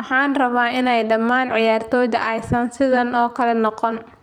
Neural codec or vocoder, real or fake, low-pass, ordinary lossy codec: vocoder, 44.1 kHz, 128 mel bands, Pupu-Vocoder; fake; 19.8 kHz; none